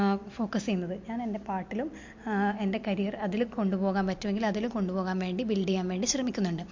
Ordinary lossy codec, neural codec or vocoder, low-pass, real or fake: MP3, 48 kbps; none; 7.2 kHz; real